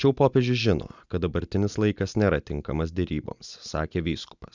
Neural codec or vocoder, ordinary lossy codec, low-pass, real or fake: none; Opus, 64 kbps; 7.2 kHz; real